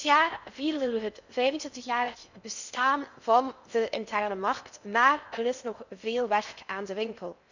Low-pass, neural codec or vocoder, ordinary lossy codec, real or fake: 7.2 kHz; codec, 16 kHz in and 24 kHz out, 0.6 kbps, FocalCodec, streaming, 4096 codes; none; fake